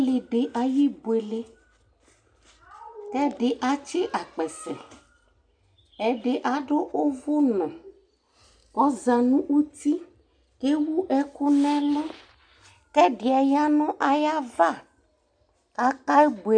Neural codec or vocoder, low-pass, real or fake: none; 9.9 kHz; real